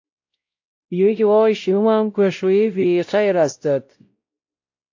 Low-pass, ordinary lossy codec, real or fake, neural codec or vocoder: 7.2 kHz; AAC, 48 kbps; fake; codec, 16 kHz, 0.5 kbps, X-Codec, WavLM features, trained on Multilingual LibriSpeech